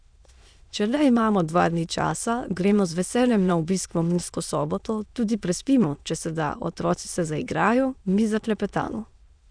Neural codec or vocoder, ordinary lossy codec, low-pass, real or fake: autoencoder, 22.05 kHz, a latent of 192 numbers a frame, VITS, trained on many speakers; none; 9.9 kHz; fake